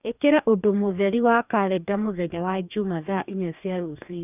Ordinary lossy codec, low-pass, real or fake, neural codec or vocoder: none; 3.6 kHz; fake; codec, 44.1 kHz, 2.6 kbps, DAC